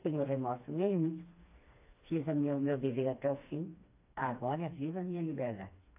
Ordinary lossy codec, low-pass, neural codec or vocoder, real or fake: AAC, 24 kbps; 3.6 kHz; codec, 16 kHz, 2 kbps, FreqCodec, smaller model; fake